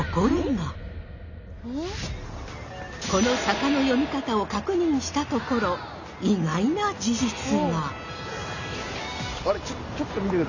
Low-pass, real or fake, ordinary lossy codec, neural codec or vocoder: 7.2 kHz; real; none; none